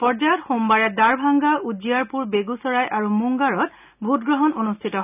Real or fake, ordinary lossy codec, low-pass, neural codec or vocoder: real; none; 3.6 kHz; none